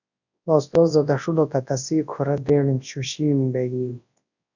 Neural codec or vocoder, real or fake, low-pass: codec, 24 kHz, 0.9 kbps, WavTokenizer, large speech release; fake; 7.2 kHz